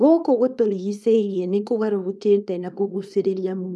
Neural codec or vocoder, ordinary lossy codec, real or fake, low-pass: codec, 24 kHz, 0.9 kbps, WavTokenizer, small release; none; fake; none